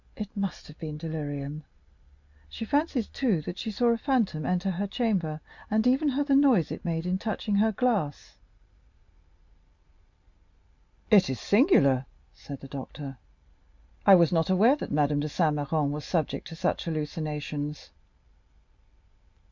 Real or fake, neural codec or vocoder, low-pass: real; none; 7.2 kHz